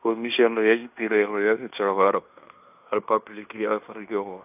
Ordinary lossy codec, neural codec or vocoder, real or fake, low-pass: none; codec, 16 kHz in and 24 kHz out, 0.9 kbps, LongCat-Audio-Codec, fine tuned four codebook decoder; fake; 3.6 kHz